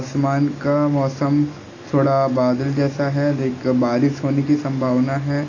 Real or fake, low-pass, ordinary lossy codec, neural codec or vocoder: real; 7.2 kHz; AAC, 32 kbps; none